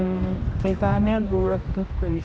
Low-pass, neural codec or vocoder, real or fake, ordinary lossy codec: none; codec, 16 kHz, 1 kbps, X-Codec, HuBERT features, trained on general audio; fake; none